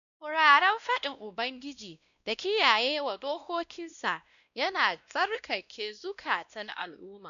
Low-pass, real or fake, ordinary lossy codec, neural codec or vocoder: 7.2 kHz; fake; none; codec, 16 kHz, 1 kbps, X-Codec, WavLM features, trained on Multilingual LibriSpeech